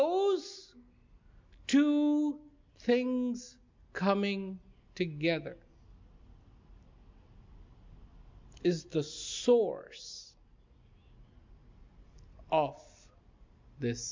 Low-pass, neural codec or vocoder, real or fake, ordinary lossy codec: 7.2 kHz; none; real; MP3, 64 kbps